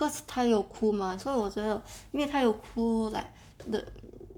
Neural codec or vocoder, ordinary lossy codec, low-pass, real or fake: codec, 44.1 kHz, 7.8 kbps, Pupu-Codec; none; 19.8 kHz; fake